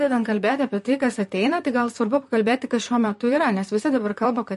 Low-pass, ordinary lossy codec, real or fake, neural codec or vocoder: 14.4 kHz; MP3, 48 kbps; fake; vocoder, 44.1 kHz, 128 mel bands, Pupu-Vocoder